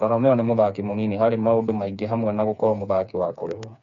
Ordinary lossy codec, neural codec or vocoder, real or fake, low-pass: none; codec, 16 kHz, 4 kbps, FreqCodec, smaller model; fake; 7.2 kHz